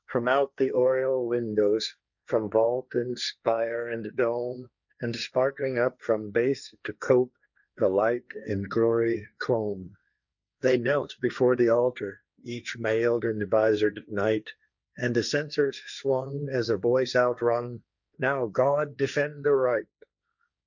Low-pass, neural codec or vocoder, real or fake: 7.2 kHz; codec, 16 kHz, 1.1 kbps, Voila-Tokenizer; fake